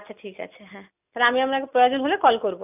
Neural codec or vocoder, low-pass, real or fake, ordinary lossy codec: none; 3.6 kHz; real; none